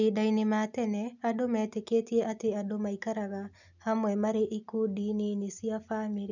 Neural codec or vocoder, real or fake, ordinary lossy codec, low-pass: none; real; none; 7.2 kHz